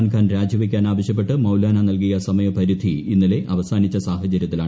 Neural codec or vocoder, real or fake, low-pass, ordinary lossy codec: none; real; none; none